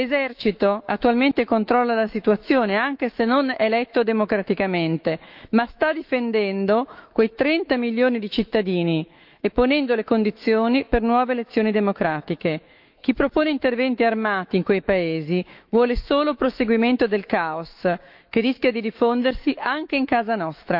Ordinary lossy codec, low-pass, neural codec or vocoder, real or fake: Opus, 24 kbps; 5.4 kHz; autoencoder, 48 kHz, 128 numbers a frame, DAC-VAE, trained on Japanese speech; fake